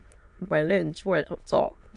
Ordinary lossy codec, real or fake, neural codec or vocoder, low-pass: AAC, 64 kbps; fake; autoencoder, 22.05 kHz, a latent of 192 numbers a frame, VITS, trained on many speakers; 9.9 kHz